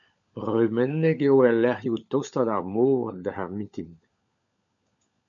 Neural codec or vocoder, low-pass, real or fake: codec, 16 kHz, 16 kbps, FunCodec, trained on LibriTTS, 50 frames a second; 7.2 kHz; fake